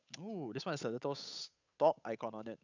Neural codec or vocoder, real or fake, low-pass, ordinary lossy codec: codec, 16 kHz, 8 kbps, FunCodec, trained on Chinese and English, 25 frames a second; fake; 7.2 kHz; none